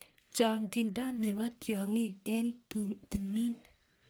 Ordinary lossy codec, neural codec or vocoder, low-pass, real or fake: none; codec, 44.1 kHz, 1.7 kbps, Pupu-Codec; none; fake